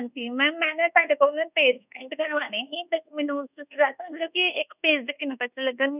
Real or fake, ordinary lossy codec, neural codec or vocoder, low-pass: fake; none; codec, 24 kHz, 1.2 kbps, DualCodec; 3.6 kHz